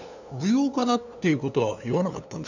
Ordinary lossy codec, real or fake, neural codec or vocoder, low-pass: none; fake; codec, 16 kHz, 2 kbps, FunCodec, trained on LibriTTS, 25 frames a second; 7.2 kHz